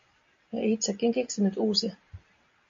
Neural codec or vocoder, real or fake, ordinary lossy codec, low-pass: none; real; MP3, 48 kbps; 7.2 kHz